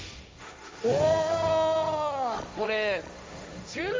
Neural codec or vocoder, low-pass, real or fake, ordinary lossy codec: codec, 16 kHz, 1.1 kbps, Voila-Tokenizer; none; fake; none